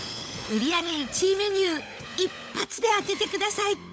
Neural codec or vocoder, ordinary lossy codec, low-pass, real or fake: codec, 16 kHz, 16 kbps, FunCodec, trained on LibriTTS, 50 frames a second; none; none; fake